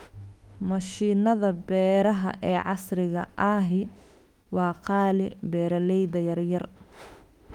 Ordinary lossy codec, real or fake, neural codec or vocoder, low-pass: Opus, 32 kbps; fake; autoencoder, 48 kHz, 32 numbers a frame, DAC-VAE, trained on Japanese speech; 19.8 kHz